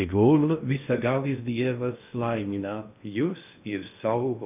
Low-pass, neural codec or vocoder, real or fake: 3.6 kHz; codec, 16 kHz in and 24 kHz out, 0.6 kbps, FocalCodec, streaming, 2048 codes; fake